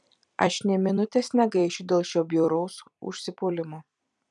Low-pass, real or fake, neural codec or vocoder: 10.8 kHz; fake; vocoder, 44.1 kHz, 128 mel bands every 256 samples, BigVGAN v2